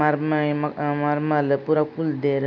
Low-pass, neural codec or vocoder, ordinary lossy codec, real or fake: none; none; none; real